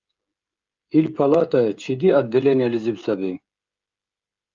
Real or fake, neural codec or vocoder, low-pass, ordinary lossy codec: fake; codec, 16 kHz, 16 kbps, FreqCodec, smaller model; 7.2 kHz; Opus, 32 kbps